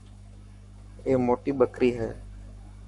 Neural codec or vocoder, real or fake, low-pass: codec, 44.1 kHz, 7.8 kbps, Pupu-Codec; fake; 10.8 kHz